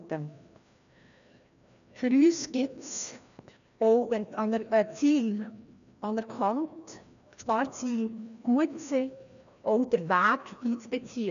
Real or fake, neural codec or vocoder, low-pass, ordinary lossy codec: fake; codec, 16 kHz, 1 kbps, FreqCodec, larger model; 7.2 kHz; none